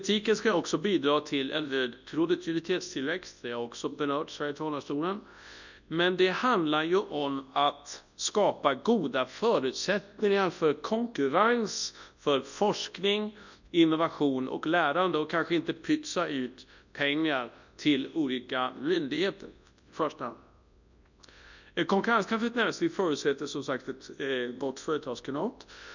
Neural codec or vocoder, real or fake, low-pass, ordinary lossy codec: codec, 24 kHz, 0.9 kbps, WavTokenizer, large speech release; fake; 7.2 kHz; none